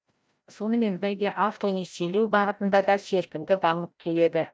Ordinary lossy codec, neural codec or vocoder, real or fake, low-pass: none; codec, 16 kHz, 0.5 kbps, FreqCodec, larger model; fake; none